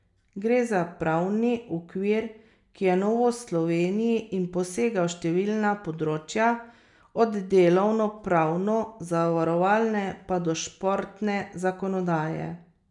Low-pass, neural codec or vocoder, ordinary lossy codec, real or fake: 10.8 kHz; none; none; real